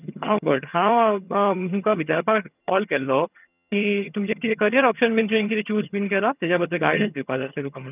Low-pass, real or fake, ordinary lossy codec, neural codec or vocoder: 3.6 kHz; fake; none; vocoder, 22.05 kHz, 80 mel bands, HiFi-GAN